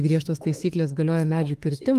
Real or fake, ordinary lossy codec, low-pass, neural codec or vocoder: fake; Opus, 24 kbps; 14.4 kHz; autoencoder, 48 kHz, 32 numbers a frame, DAC-VAE, trained on Japanese speech